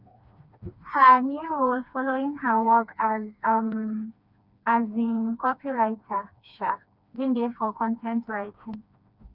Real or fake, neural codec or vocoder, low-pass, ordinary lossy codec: fake; codec, 16 kHz, 2 kbps, FreqCodec, smaller model; 5.4 kHz; Opus, 64 kbps